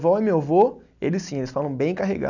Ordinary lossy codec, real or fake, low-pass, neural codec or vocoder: none; real; 7.2 kHz; none